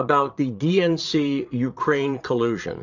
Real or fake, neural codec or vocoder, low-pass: fake; vocoder, 22.05 kHz, 80 mel bands, Vocos; 7.2 kHz